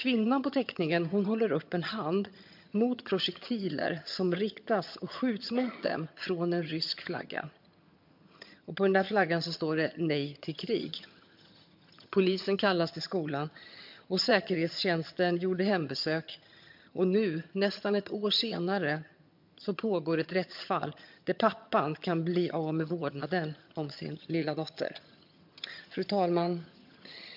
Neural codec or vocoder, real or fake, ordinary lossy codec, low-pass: vocoder, 22.05 kHz, 80 mel bands, HiFi-GAN; fake; MP3, 48 kbps; 5.4 kHz